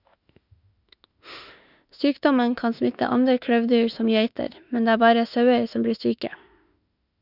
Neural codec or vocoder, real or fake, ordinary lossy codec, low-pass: autoencoder, 48 kHz, 32 numbers a frame, DAC-VAE, trained on Japanese speech; fake; none; 5.4 kHz